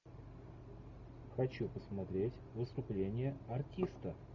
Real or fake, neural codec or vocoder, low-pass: real; none; 7.2 kHz